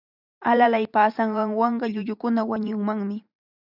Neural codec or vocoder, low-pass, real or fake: vocoder, 44.1 kHz, 128 mel bands every 512 samples, BigVGAN v2; 5.4 kHz; fake